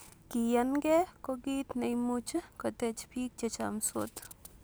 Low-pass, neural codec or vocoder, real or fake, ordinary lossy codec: none; none; real; none